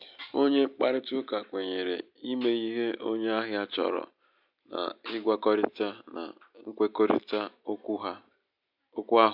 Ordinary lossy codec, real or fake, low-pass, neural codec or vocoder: MP3, 48 kbps; real; 5.4 kHz; none